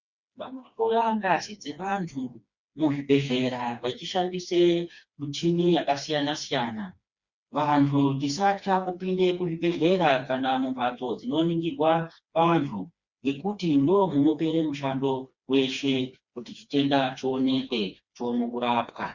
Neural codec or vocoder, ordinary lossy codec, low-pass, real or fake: codec, 16 kHz, 2 kbps, FreqCodec, smaller model; Opus, 64 kbps; 7.2 kHz; fake